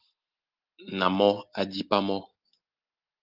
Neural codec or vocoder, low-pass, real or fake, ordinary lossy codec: none; 5.4 kHz; real; Opus, 24 kbps